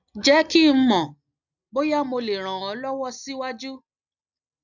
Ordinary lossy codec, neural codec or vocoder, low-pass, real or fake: none; none; 7.2 kHz; real